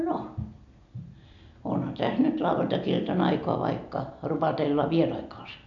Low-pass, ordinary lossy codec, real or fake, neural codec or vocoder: 7.2 kHz; none; real; none